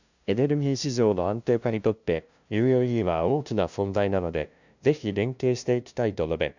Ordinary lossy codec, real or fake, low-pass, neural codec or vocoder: none; fake; 7.2 kHz; codec, 16 kHz, 0.5 kbps, FunCodec, trained on LibriTTS, 25 frames a second